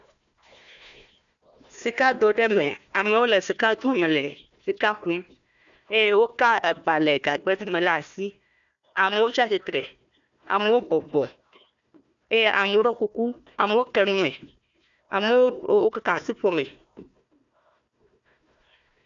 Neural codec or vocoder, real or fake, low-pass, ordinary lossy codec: codec, 16 kHz, 1 kbps, FunCodec, trained on Chinese and English, 50 frames a second; fake; 7.2 kHz; MP3, 96 kbps